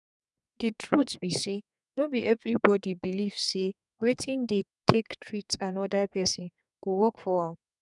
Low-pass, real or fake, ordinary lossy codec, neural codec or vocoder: 10.8 kHz; fake; none; codec, 32 kHz, 1.9 kbps, SNAC